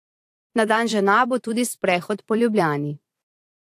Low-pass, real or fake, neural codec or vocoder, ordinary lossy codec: 14.4 kHz; fake; vocoder, 44.1 kHz, 128 mel bands every 256 samples, BigVGAN v2; AAC, 64 kbps